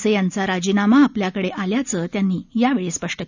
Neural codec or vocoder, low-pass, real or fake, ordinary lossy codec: none; 7.2 kHz; real; none